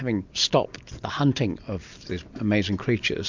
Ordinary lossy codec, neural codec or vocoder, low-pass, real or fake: MP3, 64 kbps; none; 7.2 kHz; real